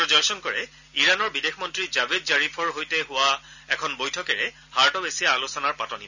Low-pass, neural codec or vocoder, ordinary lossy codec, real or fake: 7.2 kHz; none; none; real